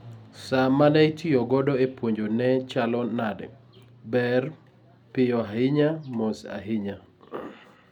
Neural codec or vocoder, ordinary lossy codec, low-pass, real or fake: none; none; 19.8 kHz; real